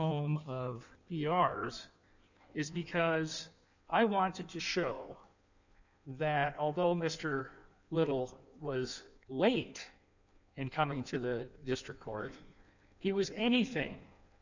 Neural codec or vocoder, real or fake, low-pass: codec, 16 kHz in and 24 kHz out, 1.1 kbps, FireRedTTS-2 codec; fake; 7.2 kHz